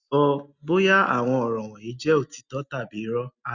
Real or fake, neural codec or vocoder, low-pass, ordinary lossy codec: real; none; 7.2 kHz; Opus, 64 kbps